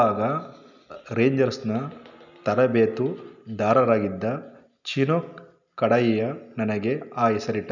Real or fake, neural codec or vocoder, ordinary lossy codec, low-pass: real; none; none; 7.2 kHz